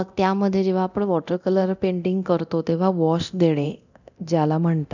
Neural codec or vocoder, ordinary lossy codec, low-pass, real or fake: codec, 24 kHz, 0.9 kbps, DualCodec; none; 7.2 kHz; fake